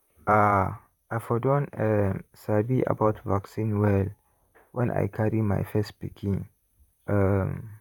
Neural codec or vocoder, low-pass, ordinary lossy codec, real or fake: vocoder, 44.1 kHz, 128 mel bands, Pupu-Vocoder; 19.8 kHz; none; fake